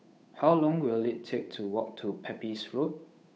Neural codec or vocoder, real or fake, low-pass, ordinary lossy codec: codec, 16 kHz, 8 kbps, FunCodec, trained on Chinese and English, 25 frames a second; fake; none; none